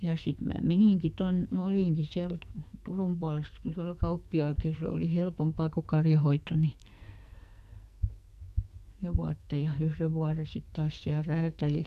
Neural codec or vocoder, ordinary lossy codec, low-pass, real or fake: codec, 44.1 kHz, 2.6 kbps, SNAC; none; 14.4 kHz; fake